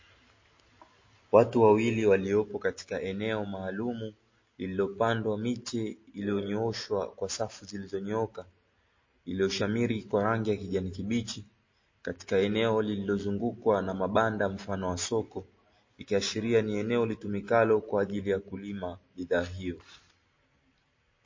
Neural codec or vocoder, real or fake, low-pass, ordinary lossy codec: none; real; 7.2 kHz; MP3, 32 kbps